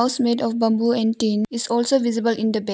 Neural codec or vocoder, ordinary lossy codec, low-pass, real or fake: none; none; none; real